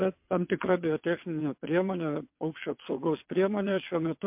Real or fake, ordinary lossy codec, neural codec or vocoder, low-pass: fake; MP3, 32 kbps; vocoder, 22.05 kHz, 80 mel bands, WaveNeXt; 3.6 kHz